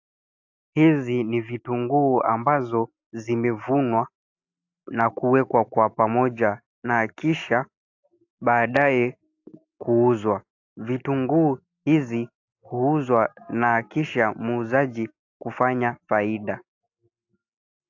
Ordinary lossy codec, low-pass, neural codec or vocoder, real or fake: AAC, 48 kbps; 7.2 kHz; none; real